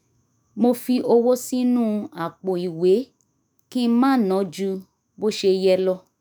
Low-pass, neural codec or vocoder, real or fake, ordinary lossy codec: none; autoencoder, 48 kHz, 128 numbers a frame, DAC-VAE, trained on Japanese speech; fake; none